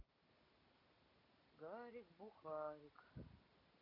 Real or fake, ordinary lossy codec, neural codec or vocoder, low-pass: real; none; none; 5.4 kHz